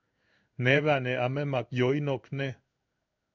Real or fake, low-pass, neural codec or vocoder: fake; 7.2 kHz; codec, 16 kHz in and 24 kHz out, 1 kbps, XY-Tokenizer